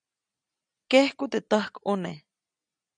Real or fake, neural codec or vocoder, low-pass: real; none; 9.9 kHz